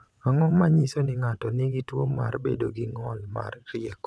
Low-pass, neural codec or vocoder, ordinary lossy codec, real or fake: none; vocoder, 22.05 kHz, 80 mel bands, WaveNeXt; none; fake